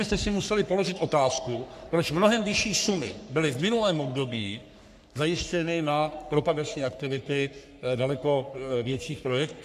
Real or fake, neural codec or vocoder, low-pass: fake; codec, 44.1 kHz, 3.4 kbps, Pupu-Codec; 14.4 kHz